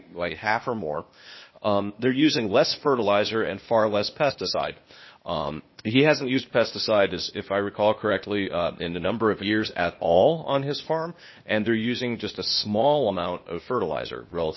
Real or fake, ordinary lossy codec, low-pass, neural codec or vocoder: fake; MP3, 24 kbps; 7.2 kHz; codec, 16 kHz, 0.8 kbps, ZipCodec